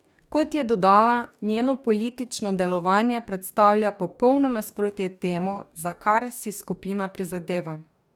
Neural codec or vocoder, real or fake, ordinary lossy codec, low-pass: codec, 44.1 kHz, 2.6 kbps, DAC; fake; none; 19.8 kHz